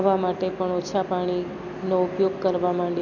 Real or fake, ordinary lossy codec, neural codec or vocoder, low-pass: real; none; none; 7.2 kHz